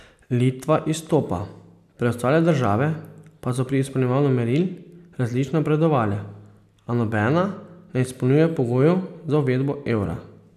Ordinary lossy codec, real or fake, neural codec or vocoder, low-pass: none; real; none; 14.4 kHz